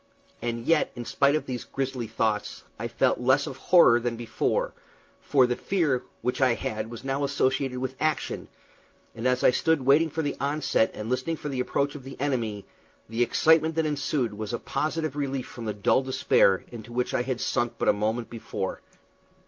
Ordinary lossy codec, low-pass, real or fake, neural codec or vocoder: Opus, 24 kbps; 7.2 kHz; real; none